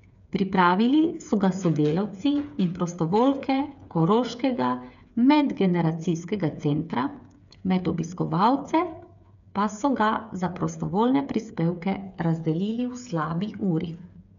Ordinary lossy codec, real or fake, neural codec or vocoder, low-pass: none; fake; codec, 16 kHz, 8 kbps, FreqCodec, smaller model; 7.2 kHz